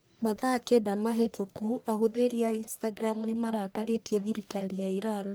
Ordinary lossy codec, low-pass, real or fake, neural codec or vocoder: none; none; fake; codec, 44.1 kHz, 1.7 kbps, Pupu-Codec